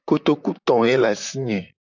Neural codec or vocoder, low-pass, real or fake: vocoder, 44.1 kHz, 128 mel bands, Pupu-Vocoder; 7.2 kHz; fake